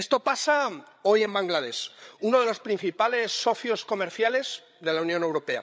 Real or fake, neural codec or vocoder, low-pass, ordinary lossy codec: fake; codec, 16 kHz, 8 kbps, FreqCodec, larger model; none; none